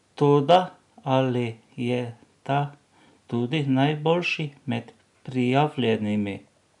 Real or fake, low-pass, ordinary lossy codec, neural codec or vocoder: real; 10.8 kHz; none; none